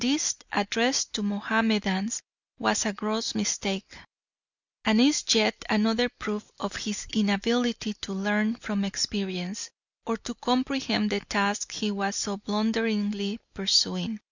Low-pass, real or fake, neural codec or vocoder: 7.2 kHz; real; none